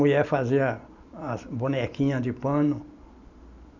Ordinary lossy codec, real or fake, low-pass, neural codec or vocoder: none; real; 7.2 kHz; none